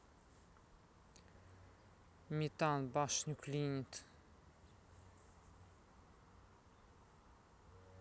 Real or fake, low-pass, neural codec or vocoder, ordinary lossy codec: real; none; none; none